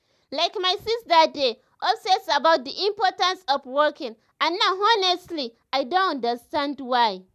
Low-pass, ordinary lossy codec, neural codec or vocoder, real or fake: 14.4 kHz; none; none; real